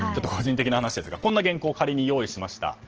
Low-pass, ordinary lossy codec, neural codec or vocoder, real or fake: 7.2 kHz; Opus, 16 kbps; none; real